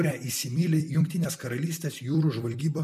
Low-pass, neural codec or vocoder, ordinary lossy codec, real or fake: 14.4 kHz; vocoder, 44.1 kHz, 128 mel bands every 256 samples, BigVGAN v2; MP3, 96 kbps; fake